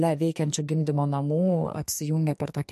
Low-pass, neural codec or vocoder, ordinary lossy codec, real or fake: 14.4 kHz; codec, 44.1 kHz, 2.6 kbps, SNAC; MP3, 64 kbps; fake